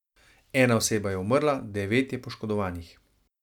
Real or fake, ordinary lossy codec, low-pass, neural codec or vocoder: real; none; 19.8 kHz; none